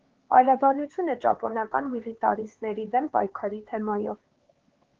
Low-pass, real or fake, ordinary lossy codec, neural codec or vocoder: 7.2 kHz; fake; Opus, 16 kbps; codec, 16 kHz, 4 kbps, X-Codec, HuBERT features, trained on LibriSpeech